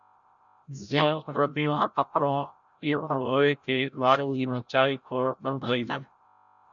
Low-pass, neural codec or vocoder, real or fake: 7.2 kHz; codec, 16 kHz, 0.5 kbps, FreqCodec, larger model; fake